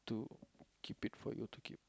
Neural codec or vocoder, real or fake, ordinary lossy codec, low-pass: none; real; none; none